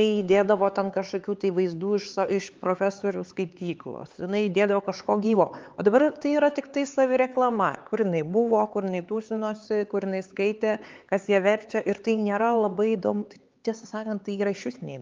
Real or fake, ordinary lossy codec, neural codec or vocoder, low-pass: fake; Opus, 32 kbps; codec, 16 kHz, 4 kbps, X-Codec, HuBERT features, trained on LibriSpeech; 7.2 kHz